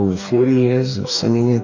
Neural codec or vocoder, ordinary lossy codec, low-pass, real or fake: codec, 44.1 kHz, 2.6 kbps, DAC; AAC, 48 kbps; 7.2 kHz; fake